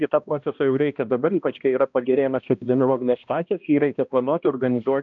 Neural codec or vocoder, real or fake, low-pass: codec, 16 kHz, 1 kbps, X-Codec, HuBERT features, trained on balanced general audio; fake; 7.2 kHz